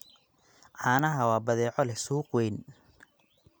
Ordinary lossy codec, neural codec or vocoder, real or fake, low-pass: none; none; real; none